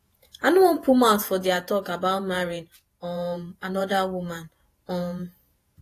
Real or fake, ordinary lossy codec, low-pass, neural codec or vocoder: fake; AAC, 48 kbps; 14.4 kHz; vocoder, 48 kHz, 128 mel bands, Vocos